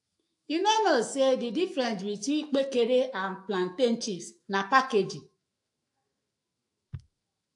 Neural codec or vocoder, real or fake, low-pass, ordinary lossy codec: autoencoder, 48 kHz, 128 numbers a frame, DAC-VAE, trained on Japanese speech; fake; 10.8 kHz; AAC, 64 kbps